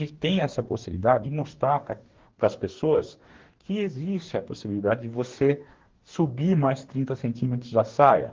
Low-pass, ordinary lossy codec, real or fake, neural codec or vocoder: 7.2 kHz; Opus, 16 kbps; fake; codec, 44.1 kHz, 2.6 kbps, DAC